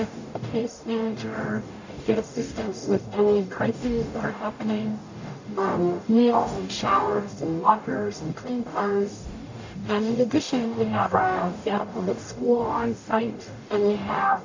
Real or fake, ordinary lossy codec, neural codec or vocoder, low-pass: fake; AAC, 48 kbps; codec, 44.1 kHz, 0.9 kbps, DAC; 7.2 kHz